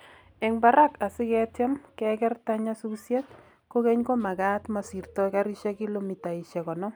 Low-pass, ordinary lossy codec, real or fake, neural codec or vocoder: none; none; real; none